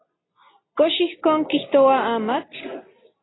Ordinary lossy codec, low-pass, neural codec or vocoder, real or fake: AAC, 16 kbps; 7.2 kHz; none; real